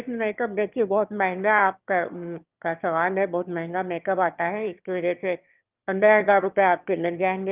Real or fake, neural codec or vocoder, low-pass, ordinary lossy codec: fake; autoencoder, 22.05 kHz, a latent of 192 numbers a frame, VITS, trained on one speaker; 3.6 kHz; Opus, 24 kbps